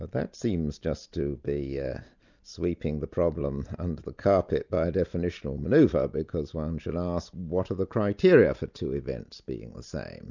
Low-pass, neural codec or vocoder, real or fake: 7.2 kHz; none; real